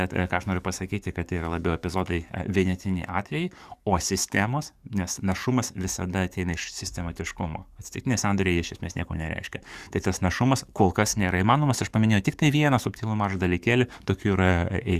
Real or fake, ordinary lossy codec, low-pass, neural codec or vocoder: fake; Opus, 64 kbps; 14.4 kHz; codec, 44.1 kHz, 7.8 kbps, DAC